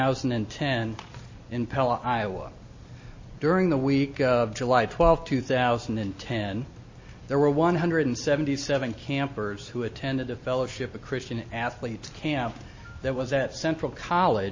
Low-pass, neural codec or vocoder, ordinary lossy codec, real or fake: 7.2 kHz; none; MP3, 32 kbps; real